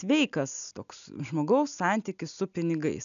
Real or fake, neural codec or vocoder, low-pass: real; none; 7.2 kHz